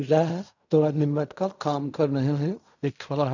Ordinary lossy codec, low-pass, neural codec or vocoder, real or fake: none; 7.2 kHz; codec, 16 kHz in and 24 kHz out, 0.4 kbps, LongCat-Audio-Codec, fine tuned four codebook decoder; fake